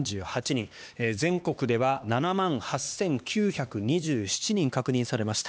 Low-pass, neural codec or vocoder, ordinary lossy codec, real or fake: none; codec, 16 kHz, 2 kbps, X-Codec, HuBERT features, trained on LibriSpeech; none; fake